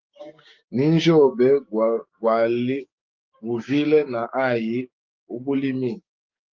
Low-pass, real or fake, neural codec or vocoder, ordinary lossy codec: 7.2 kHz; fake; codec, 16 kHz, 6 kbps, DAC; Opus, 32 kbps